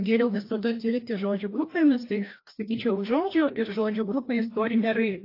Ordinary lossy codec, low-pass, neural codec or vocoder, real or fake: AAC, 32 kbps; 5.4 kHz; codec, 16 kHz, 1 kbps, FreqCodec, larger model; fake